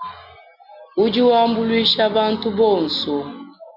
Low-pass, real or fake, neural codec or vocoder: 5.4 kHz; real; none